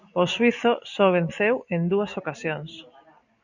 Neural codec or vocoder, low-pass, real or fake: none; 7.2 kHz; real